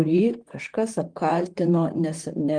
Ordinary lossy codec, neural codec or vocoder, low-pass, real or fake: Opus, 24 kbps; vocoder, 44.1 kHz, 128 mel bands, Pupu-Vocoder; 9.9 kHz; fake